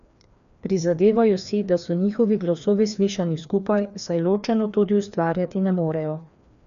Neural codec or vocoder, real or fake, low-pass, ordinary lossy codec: codec, 16 kHz, 2 kbps, FreqCodec, larger model; fake; 7.2 kHz; none